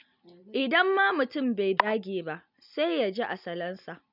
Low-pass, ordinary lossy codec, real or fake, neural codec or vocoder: 5.4 kHz; none; real; none